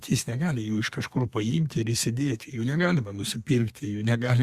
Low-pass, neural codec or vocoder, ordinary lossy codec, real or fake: 14.4 kHz; codec, 32 kHz, 1.9 kbps, SNAC; Opus, 64 kbps; fake